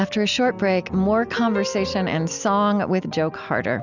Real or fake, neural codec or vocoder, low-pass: real; none; 7.2 kHz